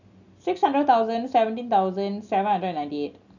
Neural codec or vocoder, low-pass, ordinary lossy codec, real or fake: none; 7.2 kHz; none; real